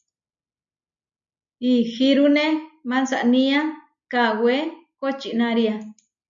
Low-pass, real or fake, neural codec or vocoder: 7.2 kHz; real; none